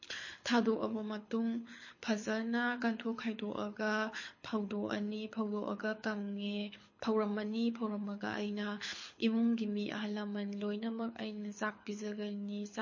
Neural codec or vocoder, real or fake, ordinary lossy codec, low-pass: codec, 24 kHz, 6 kbps, HILCodec; fake; MP3, 32 kbps; 7.2 kHz